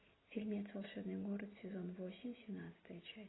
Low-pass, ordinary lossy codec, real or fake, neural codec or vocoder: 7.2 kHz; AAC, 16 kbps; real; none